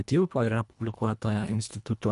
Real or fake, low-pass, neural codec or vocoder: fake; 10.8 kHz; codec, 24 kHz, 1.5 kbps, HILCodec